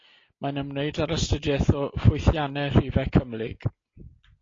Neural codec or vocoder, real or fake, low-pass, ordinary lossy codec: none; real; 7.2 kHz; AAC, 32 kbps